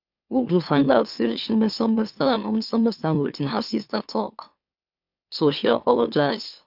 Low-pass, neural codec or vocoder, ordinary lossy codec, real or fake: 5.4 kHz; autoencoder, 44.1 kHz, a latent of 192 numbers a frame, MeloTTS; none; fake